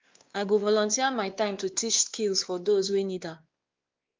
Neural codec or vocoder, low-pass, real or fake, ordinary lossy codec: codec, 16 kHz, 2 kbps, X-Codec, WavLM features, trained on Multilingual LibriSpeech; 7.2 kHz; fake; Opus, 32 kbps